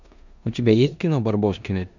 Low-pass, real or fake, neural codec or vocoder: 7.2 kHz; fake; codec, 16 kHz in and 24 kHz out, 0.9 kbps, LongCat-Audio-Codec, four codebook decoder